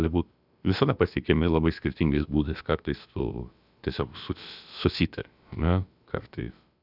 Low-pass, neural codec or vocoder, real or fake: 5.4 kHz; codec, 16 kHz, about 1 kbps, DyCAST, with the encoder's durations; fake